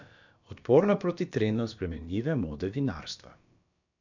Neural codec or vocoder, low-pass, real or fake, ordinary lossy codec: codec, 16 kHz, about 1 kbps, DyCAST, with the encoder's durations; 7.2 kHz; fake; none